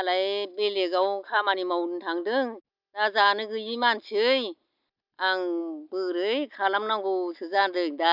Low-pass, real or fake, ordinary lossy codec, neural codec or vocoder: 5.4 kHz; real; none; none